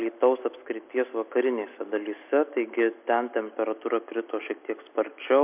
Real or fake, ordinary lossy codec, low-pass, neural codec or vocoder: real; MP3, 32 kbps; 3.6 kHz; none